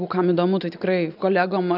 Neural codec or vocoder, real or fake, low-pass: none; real; 5.4 kHz